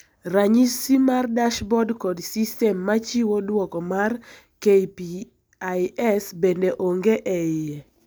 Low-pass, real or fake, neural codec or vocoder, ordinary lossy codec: none; real; none; none